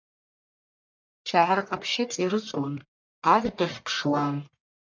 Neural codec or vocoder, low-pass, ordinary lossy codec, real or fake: codec, 44.1 kHz, 1.7 kbps, Pupu-Codec; 7.2 kHz; MP3, 64 kbps; fake